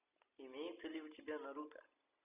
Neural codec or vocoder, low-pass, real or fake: none; 3.6 kHz; real